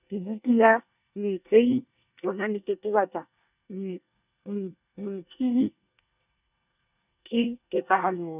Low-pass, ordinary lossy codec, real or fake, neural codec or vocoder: 3.6 kHz; none; fake; codec, 24 kHz, 1 kbps, SNAC